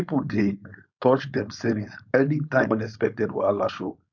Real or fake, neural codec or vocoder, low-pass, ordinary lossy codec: fake; codec, 16 kHz, 4.8 kbps, FACodec; 7.2 kHz; none